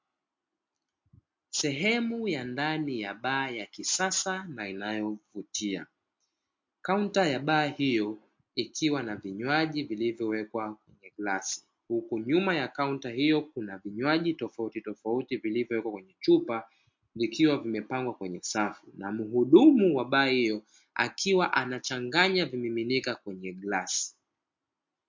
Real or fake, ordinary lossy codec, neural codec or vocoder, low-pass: real; MP3, 48 kbps; none; 7.2 kHz